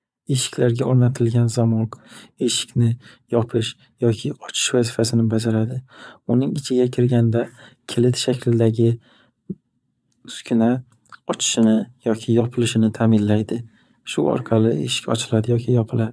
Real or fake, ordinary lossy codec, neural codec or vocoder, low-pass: fake; none; vocoder, 22.05 kHz, 80 mel bands, Vocos; none